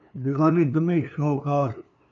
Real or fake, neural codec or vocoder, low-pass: fake; codec, 16 kHz, 2 kbps, FunCodec, trained on LibriTTS, 25 frames a second; 7.2 kHz